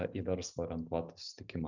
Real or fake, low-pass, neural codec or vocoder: real; 7.2 kHz; none